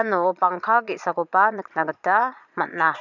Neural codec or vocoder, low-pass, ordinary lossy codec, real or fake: vocoder, 22.05 kHz, 80 mel bands, Vocos; 7.2 kHz; none; fake